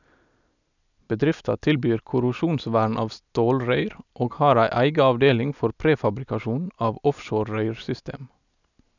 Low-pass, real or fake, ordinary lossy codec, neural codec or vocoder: 7.2 kHz; real; none; none